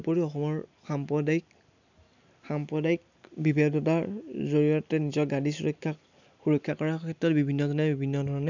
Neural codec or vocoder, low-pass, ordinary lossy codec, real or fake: none; 7.2 kHz; none; real